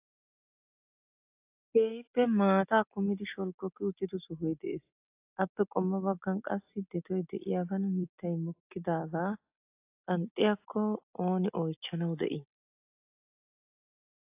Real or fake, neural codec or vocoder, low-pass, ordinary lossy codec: real; none; 3.6 kHz; AAC, 32 kbps